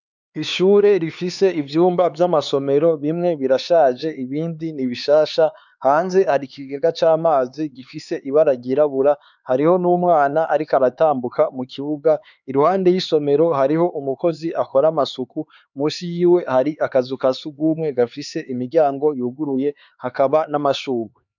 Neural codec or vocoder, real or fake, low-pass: codec, 16 kHz, 4 kbps, X-Codec, HuBERT features, trained on LibriSpeech; fake; 7.2 kHz